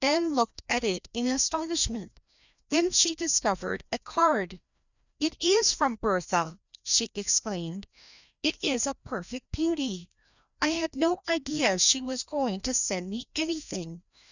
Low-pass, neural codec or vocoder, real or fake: 7.2 kHz; codec, 16 kHz, 1 kbps, FreqCodec, larger model; fake